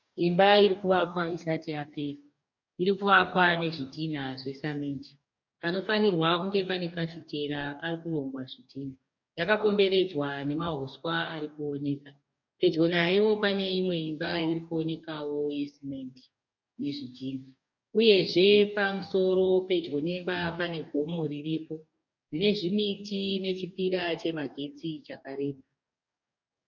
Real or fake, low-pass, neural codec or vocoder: fake; 7.2 kHz; codec, 44.1 kHz, 2.6 kbps, DAC